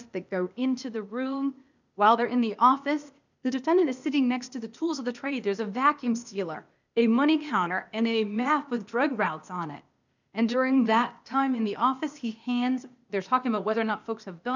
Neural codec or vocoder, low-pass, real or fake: codec, 16 kHz, 0.8 kbps, ZipCodec; 7.2 kHz; fake